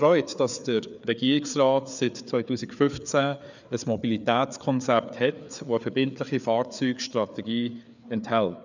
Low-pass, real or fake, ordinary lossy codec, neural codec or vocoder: 7.2 kHz; fake; none; codec, 16 kHz, 4 kbps, FreqCodec, larger model